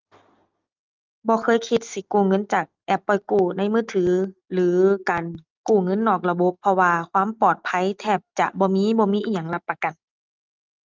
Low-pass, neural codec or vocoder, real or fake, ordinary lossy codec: 7.2 kHz; none; real; Opus, 32 kbps